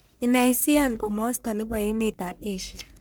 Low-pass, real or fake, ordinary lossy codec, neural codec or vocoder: none; fake; none; codec, 44.1 kHz, 1.7 kbps, Pupu-Codec